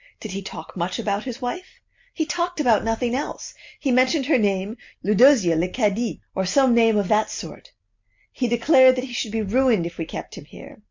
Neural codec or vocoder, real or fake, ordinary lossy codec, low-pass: none; real; MP3, 48 kbps; 7.2 kHz